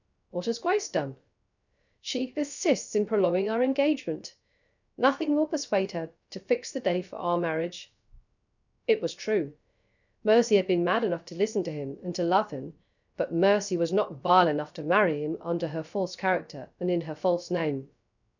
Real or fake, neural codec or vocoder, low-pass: fake; codec, 16 kHz, 0.3 kbps, FocalCodec; 7.2 kHz